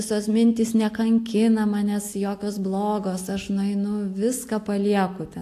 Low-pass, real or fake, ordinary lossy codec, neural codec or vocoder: 14.4 kHz; real; AAC, 64 kbps; none